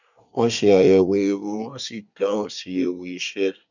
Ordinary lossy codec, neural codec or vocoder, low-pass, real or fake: none; codec, 24 kHz, 1 kbps, SNAC; 7.2 kHz; fake